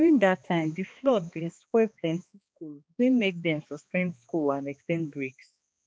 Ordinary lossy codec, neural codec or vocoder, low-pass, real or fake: none; codec, 16 kHz, 2 kbps, X-Codec, HuBERT features, trained on balanced general audio; none; fake